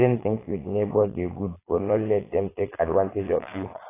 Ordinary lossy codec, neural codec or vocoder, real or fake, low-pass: AAC, 16 kbps; vocoder, 22.05 kHz, 80 mel bands, Vocos; fake; 3.6 kHz